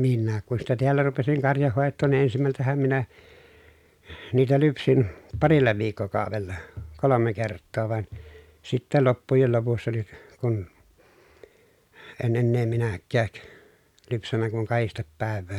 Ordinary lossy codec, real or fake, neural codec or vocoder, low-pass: none; real; none; 19.8 kHz